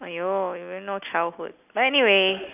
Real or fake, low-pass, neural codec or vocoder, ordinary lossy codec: real; 3.6 kHz; none; none